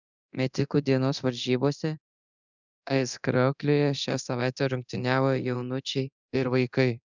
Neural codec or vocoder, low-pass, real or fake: codec, 24 kHz, 0.9 kbps, DualCodec; 7.2 kHz; fake